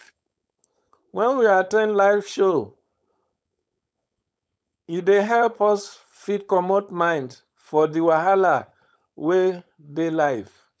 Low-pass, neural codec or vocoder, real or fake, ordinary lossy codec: none; codec, 16 kHz, 4.8 kbps, FACodec; fake; none